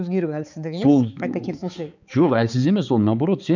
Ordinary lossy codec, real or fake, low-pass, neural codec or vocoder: none; fake; 7.2 kHz; codec, 16 kHz, 4 kbps, X-Codec, HuBERT features, trained on balanced general audio